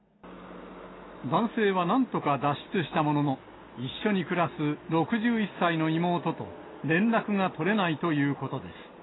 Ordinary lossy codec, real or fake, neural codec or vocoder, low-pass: AAC, 16 kbps; real; none; 7.2 kHz